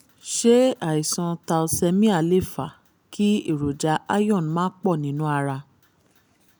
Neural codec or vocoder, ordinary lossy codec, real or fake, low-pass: none; none; real; none